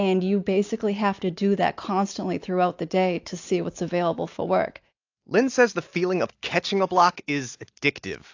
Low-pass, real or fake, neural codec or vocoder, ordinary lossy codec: 7.2 kHz; real; none; AAC, 48 kbps